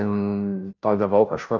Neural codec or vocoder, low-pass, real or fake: codec, 16 kHz, 0.5 kbps, FunCodec, trained on Chinese and English, 25 frames a second; 7.2 kHz; fake